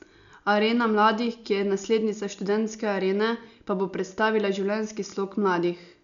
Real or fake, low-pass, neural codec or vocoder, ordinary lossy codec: real; 7.2 kHz; none; none